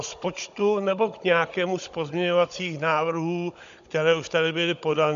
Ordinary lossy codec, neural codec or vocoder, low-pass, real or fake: MP3, 64 kbps; codec, 16 kHz, 16 kbps, FunCodec, trained on Chinese and English, 50 frames a second; 7.2 kHz; fake